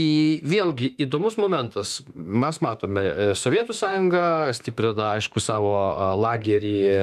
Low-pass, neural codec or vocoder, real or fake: 14.4 kHz; autoencoder, 48 kHz, 32 numbers a frame, DAC-VAE, trained on Japanese speech; fake